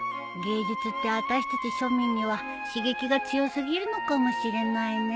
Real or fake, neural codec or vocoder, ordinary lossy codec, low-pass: real; none; none; none